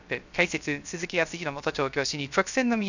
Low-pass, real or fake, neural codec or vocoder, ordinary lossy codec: 7.2 kHz; fake; codec, 16 kHz, 0.3 kbps, FocalCodec; none